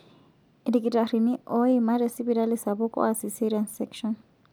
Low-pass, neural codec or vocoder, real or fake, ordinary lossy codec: none; none; real; none